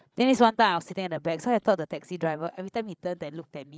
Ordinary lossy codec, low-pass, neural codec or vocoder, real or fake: none; none; codec, 16 kHz, 16 kbps, FreqCodec, larger model; fake